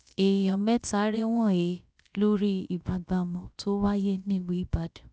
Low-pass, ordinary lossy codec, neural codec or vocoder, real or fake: none; none; codec, 16 kHz, about 1 kbps, DyCAST, with the encoder's durations; fake